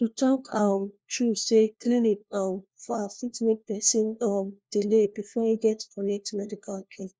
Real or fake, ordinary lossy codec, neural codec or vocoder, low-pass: fake; none; codec, 16 kHz, 1 kbps, FunCodec, trained on LibriTTS, 50 frames a second; none